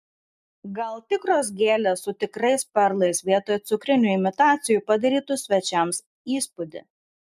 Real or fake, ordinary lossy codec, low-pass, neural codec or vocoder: real; MP3, 96 kbps; 14.4 kHz; none